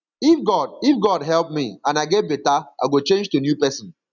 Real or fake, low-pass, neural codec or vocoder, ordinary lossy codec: real; 7.2 kHz; none; none